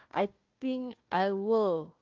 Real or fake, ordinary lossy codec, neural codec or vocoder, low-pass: fake; Opus, 24 kbps; codec, 16 kHz, 1 kbps, FunCodec, trained on Chinese and English, 50 frames a second; 7.2 kHz